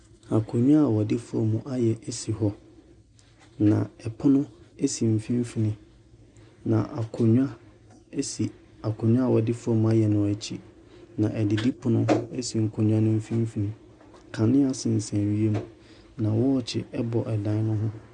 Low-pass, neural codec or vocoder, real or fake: 10.8 kHz; none; real